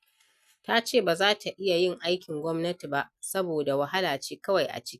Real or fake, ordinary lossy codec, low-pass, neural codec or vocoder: real; none; 14.4 kHz; none